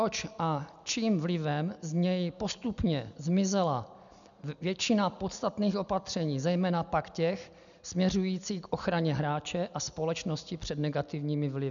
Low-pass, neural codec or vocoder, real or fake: 7.2 kHz; none; real